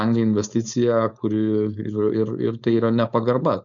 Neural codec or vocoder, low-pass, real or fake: codec, 16 kHz, 4.8 kbps, FACodec; 7.2 kHz; fake